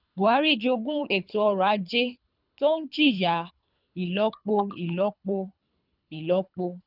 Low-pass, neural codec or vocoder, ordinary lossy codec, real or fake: 5.4 kHz; codec, 24 kHz, 3 kbps, HILCodec; none; fake